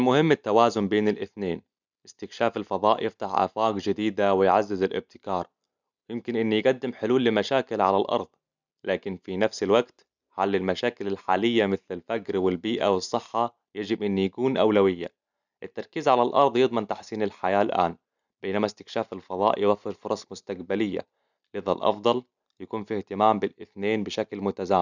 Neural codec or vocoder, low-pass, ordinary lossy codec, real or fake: none; 7.2 kHz; none; real